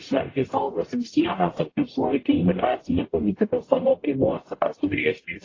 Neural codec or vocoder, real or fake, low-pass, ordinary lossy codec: codec, 44.1 kHz, 0.9 kbps, DAC; fake; 7.2 kHz; AAC, 32 kbps